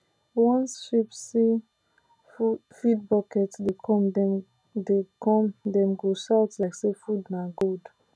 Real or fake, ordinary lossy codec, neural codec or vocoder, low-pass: real; none; none; none